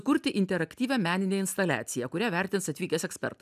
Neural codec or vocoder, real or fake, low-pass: none; real; 14.4 kHz